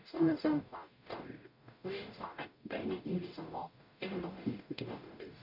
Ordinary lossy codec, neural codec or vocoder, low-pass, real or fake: none; codec, 44.1 kHz, 0.9 kbps, DAC; 5.4 kHz; fake